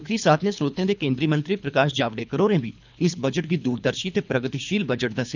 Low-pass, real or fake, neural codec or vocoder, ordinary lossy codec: 7.2 kHz; fake; codec, 24 kHz, 3 kbps, HILCodec; none